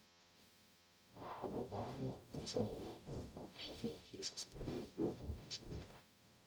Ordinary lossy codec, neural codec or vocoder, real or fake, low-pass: none; codec, 44.1 kHz, 0.9 kbps, DAC; fake; none